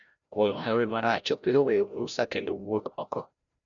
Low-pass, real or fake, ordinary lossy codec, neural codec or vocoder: 7.2 kHz; fake; none; codec, 16 kHz, 0.5 kbps, FreqCodec, larger model